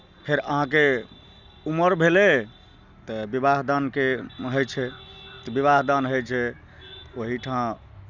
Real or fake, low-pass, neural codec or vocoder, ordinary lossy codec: real; 7.2 kHz; none; none